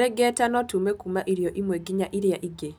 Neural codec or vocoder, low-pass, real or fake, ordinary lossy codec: none; none; real; none